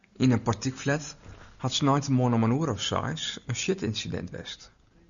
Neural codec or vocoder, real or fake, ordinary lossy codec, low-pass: none; real; MP3, 96 kbps; 7.2 kHz